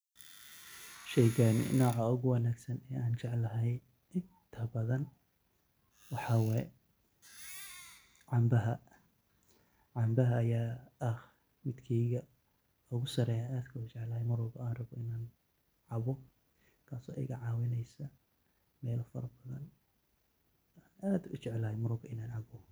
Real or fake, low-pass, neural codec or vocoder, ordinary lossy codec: real; none; none; none